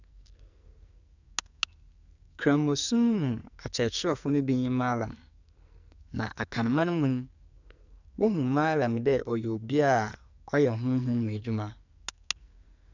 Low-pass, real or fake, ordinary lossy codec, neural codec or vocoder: 7.2 kHz; fake; none; codec, 44.1 kHz, 2.6 kbps, SNAC